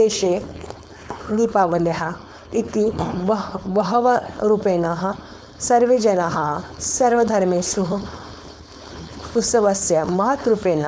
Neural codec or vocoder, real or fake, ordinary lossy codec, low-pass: codec, 16 kHz, 4.8 kbps, FACodec; fake; none; none